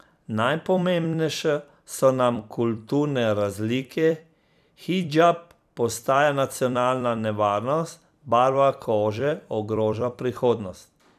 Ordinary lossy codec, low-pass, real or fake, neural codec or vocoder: none; 14.4 kHz; fake; vocoder, 44.1 kHz, 128 mel bands every 256 samples, BigVGAN v2